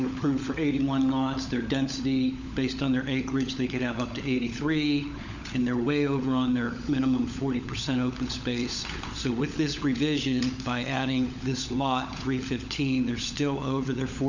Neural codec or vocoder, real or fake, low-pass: codec, 16 kHz, 16 kbps, FunCodec, trained on LibriTTS, 50 frames a second; fake; 7.2 kHz